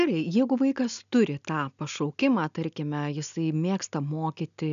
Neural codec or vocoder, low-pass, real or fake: none; 7.2 kHz; real